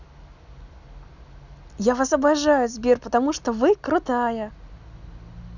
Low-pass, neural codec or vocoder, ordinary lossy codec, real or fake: 7.2 kHz; none; none; real